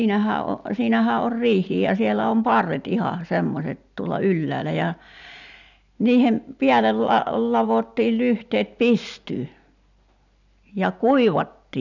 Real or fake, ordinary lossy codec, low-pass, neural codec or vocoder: real; none; 7.2 kHz; none